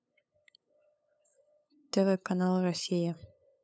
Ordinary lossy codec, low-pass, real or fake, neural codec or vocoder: none; none; fake; codec, 16 kHz, 8 kbps, FunCodec, trained on LibriTTS, 25 frames a second